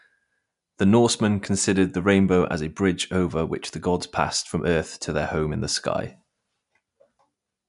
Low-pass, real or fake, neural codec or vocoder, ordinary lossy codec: 10.8 kHz; real; none; none